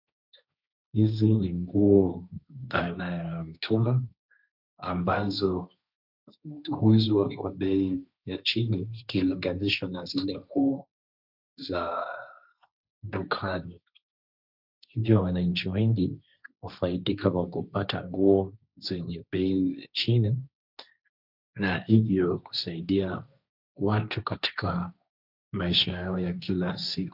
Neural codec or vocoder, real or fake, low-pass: codec, 16 kHz, 1.1 kbps, Voila-Tokenizer; fake; 5.4 kHz